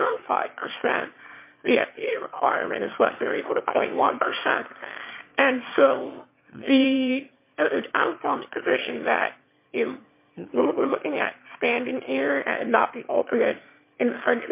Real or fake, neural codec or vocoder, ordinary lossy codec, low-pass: fake; autoencoder, 22.05 kHz, a latent of 192 numbers a frame, VITS, trained on one speaker; MP3, 24 kbps; 3.6 kHz